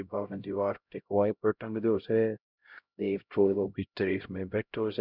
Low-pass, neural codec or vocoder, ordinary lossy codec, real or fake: 5.4 kHz; codec, 16 kHz, 0.5 kbps, X-Codec, HuBERT features, trained on LibriSpeech; none; fake